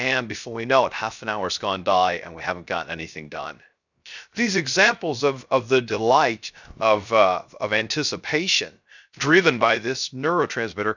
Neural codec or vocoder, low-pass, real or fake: codec, 16 kHz, 0.3 kbps, FocalCodec; 7.2 kHz; fake